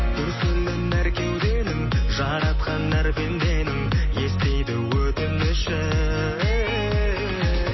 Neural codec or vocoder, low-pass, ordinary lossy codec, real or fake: none; 7.2 kHz; MP3, 24 kbps; real